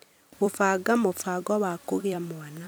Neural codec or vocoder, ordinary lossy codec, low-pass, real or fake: vocoder, 44.1 kHz, 128 mel bands every 256 samples, BigVGAN v2; none; none; fake